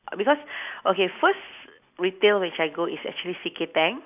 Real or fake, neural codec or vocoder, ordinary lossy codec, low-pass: real; none; none; 3.6 kHz